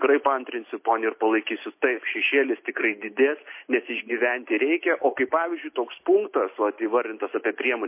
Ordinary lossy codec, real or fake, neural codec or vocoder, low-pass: MP3, 24 kbps; real; none; 3.6 kHz